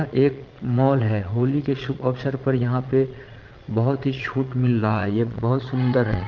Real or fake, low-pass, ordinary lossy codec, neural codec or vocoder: fake; 7.2 kHz; Opus, 32 kbps; vocoder, 22.05 kHz, 80 mel bands, Vocos